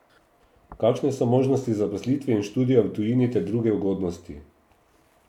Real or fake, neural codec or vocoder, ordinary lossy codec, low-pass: real; none; none; 19.8 kHz